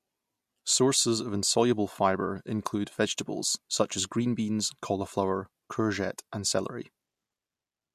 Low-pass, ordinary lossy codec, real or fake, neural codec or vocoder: 14.4 kHz; MP3, 64 kbps; real; none